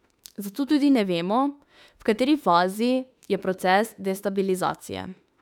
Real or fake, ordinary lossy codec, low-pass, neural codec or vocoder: fake; none; 19.8 kHz; autoencoder, 48 kHz, 32 numbers a frame, DAC-VAE, trained on Japanese speech